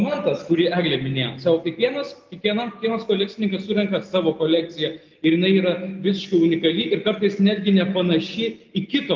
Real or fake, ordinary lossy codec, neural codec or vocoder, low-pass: real; Opus, 32 kbps; none; 7.2 kHz